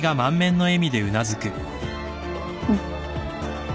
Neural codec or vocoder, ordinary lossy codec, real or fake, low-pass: none; none; real; none